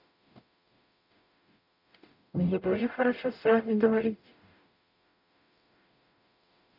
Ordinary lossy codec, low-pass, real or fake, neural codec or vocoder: none; 5.4 kHz; fake; codec, 44.1 kHz, 0.9 kbps, DAC